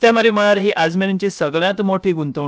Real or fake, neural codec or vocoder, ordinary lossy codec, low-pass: fake; codec, 16 kHz, 0.7 kbps, FocalCodec; none; none